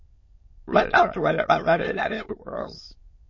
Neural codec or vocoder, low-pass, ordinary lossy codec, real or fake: autoencoder, 22.05 kHz, a latent of 192 numbers a frame, VITS, trained on many speakers; 7.2 kHz; MP3, 32 kbps; fake